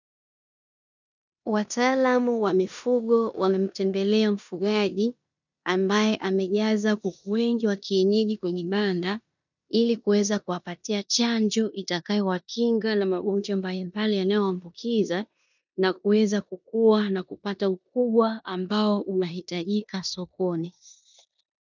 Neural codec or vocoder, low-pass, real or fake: codec, 16 kHz in and 24 kHz out, 0.9 kbps, LongCat-Audio-Codec, four codebook decoder; 7.2 kHz; fake